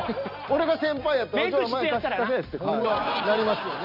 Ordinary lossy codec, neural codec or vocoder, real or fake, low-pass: none; none; real; 5.4 kHz